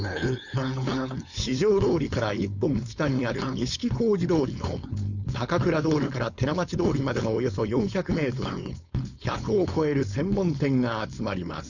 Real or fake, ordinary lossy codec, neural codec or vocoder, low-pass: fake; none; codec, 16 kHz, 4.8 kbps, FACodec; 7.2 kHz